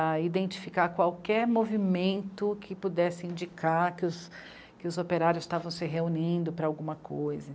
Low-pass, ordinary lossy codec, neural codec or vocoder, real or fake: none; none; none; real